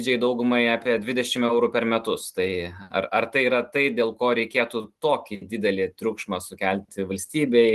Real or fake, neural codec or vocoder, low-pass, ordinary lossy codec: real; none; 14.4 kHz; Opus, 24 kbps